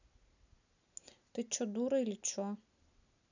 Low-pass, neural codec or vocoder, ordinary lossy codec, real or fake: 7.2 kHz; none; none; real